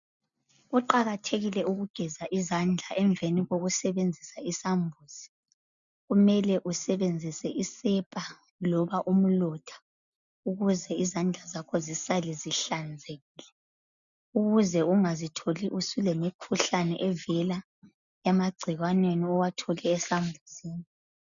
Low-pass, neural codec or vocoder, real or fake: 7.2 kHz; none; real